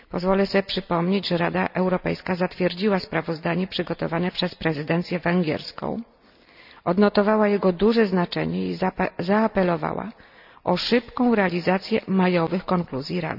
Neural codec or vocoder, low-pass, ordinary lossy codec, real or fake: none; 5.4 kHz; none; real